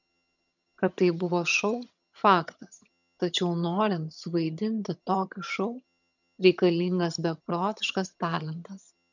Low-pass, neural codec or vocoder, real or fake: 7.2 kHz; vocoder, 22.05 kHz, 80 mel bands, HiFi-GAN; fake